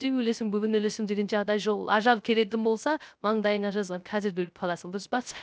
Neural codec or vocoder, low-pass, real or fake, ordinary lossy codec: codec, 16 kHz, 0.3 kbps, FocalCodec; none; fake; none